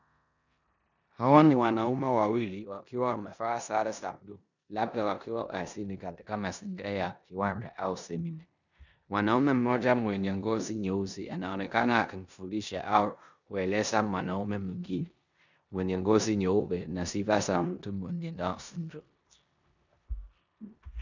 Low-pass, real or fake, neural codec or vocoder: 7.2 kHz; fake; codec, 16 kHz in and 24 kHz out, 0.9 kbps, LongCat-Audio-Codec, four codebook decoder